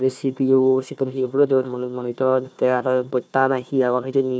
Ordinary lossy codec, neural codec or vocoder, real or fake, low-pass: none; codec, 16 kHz, 1 kbps, FunCodec, trained on Chinese and English, 50 frames a second; fake; none